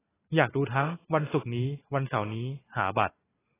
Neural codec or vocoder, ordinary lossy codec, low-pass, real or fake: vocoder, 44.1 kHz, 128 mel bands every 256 samples, BigVGAN v2; AAC, 16 kbps; 3.6 kHz; fake